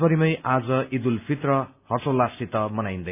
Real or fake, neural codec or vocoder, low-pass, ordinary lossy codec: real; none; 3.6 kHz; none